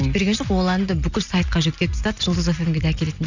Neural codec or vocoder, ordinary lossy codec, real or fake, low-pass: none; none; real; 7.2 kHz